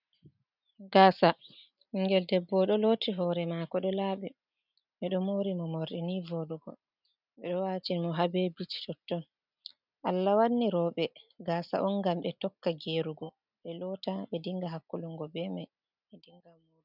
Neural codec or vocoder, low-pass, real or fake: none; 5.4 kHz; real